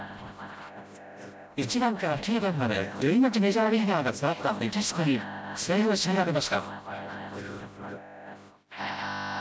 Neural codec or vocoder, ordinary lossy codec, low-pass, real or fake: codec, 16 kHz, 0.5 kbps, FreqCodec, smaller model; none; none; fake